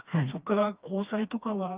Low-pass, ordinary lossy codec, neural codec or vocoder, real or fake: 3.6 kHz; Opus, 32 kbps; codec, 16 kHz, 2 kbps, FreqCodec, smaller model; fake